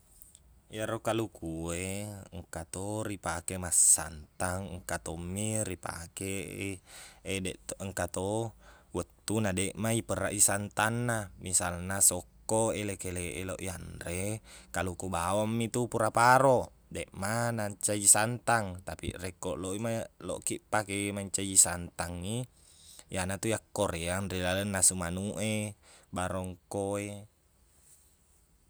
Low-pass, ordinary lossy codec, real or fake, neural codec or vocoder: none; none; real; none